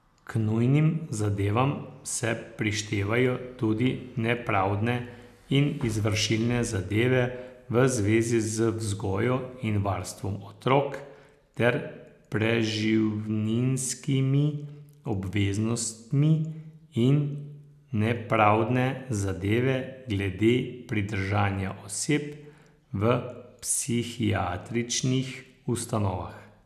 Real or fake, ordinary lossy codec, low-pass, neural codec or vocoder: real; none; 14.4 kHz; none